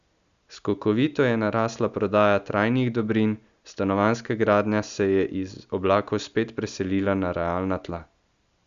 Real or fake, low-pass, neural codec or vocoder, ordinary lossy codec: real; 7.2 kHz; none; Opus, 64 kbps